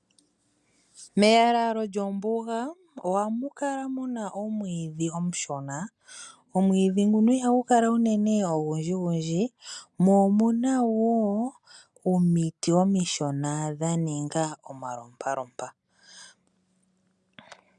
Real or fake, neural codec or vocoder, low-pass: real; none; 10.8 kHz